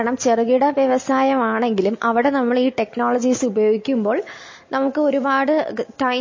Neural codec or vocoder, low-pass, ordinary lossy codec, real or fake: none; 7.2 kHz; MP3, 32 kbps; real